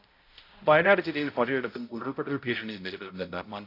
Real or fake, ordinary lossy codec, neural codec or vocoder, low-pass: fake; AAC, 32 kbps; codec, 16 kHz, 0.5 kbps, X-Codec, HuBERT features, trained on balanced general audio; 5.4 kHz